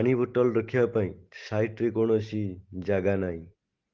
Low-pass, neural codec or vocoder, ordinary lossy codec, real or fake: 7.2 kHz; none; Opus, 16 kbps; real